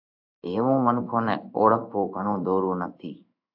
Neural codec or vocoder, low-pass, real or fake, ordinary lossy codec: codec, 16 kHz in and 24 kHz out, 1 kbps, XY-Tokenizer; 5.4 kHz; fake; AAC, 48 kbps